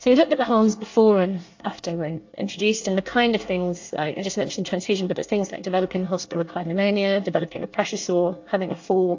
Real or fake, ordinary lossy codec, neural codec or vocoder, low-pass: fake; AAC, 48 kbps; codec, 24 kHz, 1 kbps, SNAC; 7.2 kHz